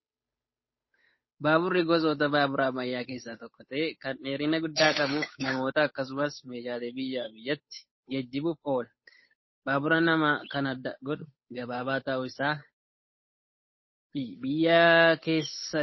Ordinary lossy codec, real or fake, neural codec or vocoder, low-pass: MP3, 24 kbps; fake; codec, 16 kHz, 8 kbps, FunCodec, trained on Chinese and English, 25 frames a second; 7.2 kHz